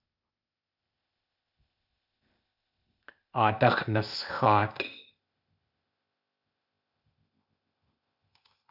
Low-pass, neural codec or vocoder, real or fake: 5.4 kHz; codec, 16 kHz, 0.8 kbps, ZipCodec; fake